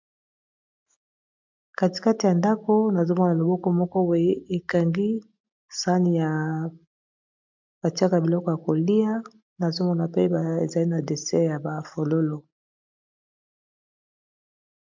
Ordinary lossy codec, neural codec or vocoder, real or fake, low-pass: MP3, 64 kbps; none; real; 7.2 kHz